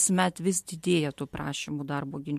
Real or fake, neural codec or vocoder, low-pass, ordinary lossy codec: real; none; 14.4 kHz; MP3, 64 kbps